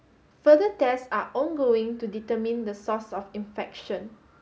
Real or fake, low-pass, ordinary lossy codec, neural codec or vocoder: real; none; none; none